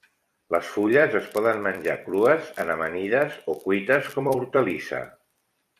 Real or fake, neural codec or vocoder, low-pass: fake; vocoder, 44.1 kHz, 128 mel bands every 256 samples, BigVGAN v2; 14.4 kHz